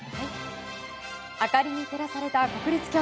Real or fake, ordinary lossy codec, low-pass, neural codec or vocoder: real; none; none; none